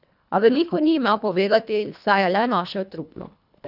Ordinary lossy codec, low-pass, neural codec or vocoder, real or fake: none; 5.4 kHz; codec, 24 kHz, 1.5 kbps, HILCodec; fake